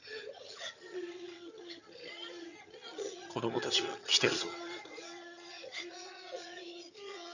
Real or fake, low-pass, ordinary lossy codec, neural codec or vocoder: fake; 7.2 kHz; none; vocoder, 22.05 kHz, 80 mel bands, HiFi-GAN